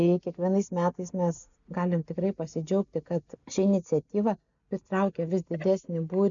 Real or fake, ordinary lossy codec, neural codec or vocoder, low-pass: real; MP3, 96 kbps; none; 7.2 kHz